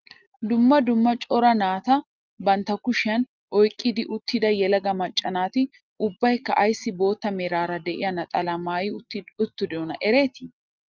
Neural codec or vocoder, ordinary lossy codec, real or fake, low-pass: none; Opus, 24 kbps; real; 7.2 kHz